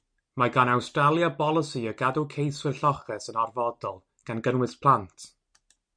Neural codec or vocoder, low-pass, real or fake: none; 9.9 kHz; real